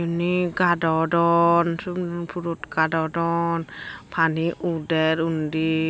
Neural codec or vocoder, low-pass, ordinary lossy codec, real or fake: none; none; none; real